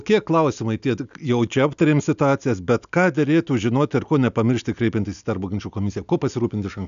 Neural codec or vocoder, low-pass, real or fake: none; 7.2 kHz; real